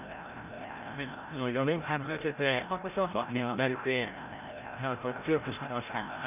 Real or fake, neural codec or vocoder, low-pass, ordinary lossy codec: fake; codec, 16 kHz, 0.5 kbps, FreqCodec, larger model; 3.6 kHz; none